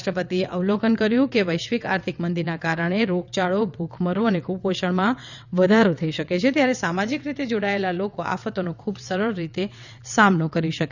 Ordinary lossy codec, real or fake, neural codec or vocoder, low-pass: none; fake; vocoder, 22.05 kHz, 80 mel bands, WaveNeXt; 7.2 kHz